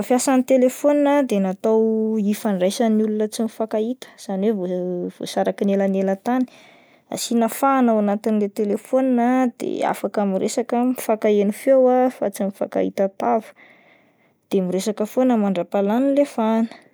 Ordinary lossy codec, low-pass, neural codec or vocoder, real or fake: none; none; none; real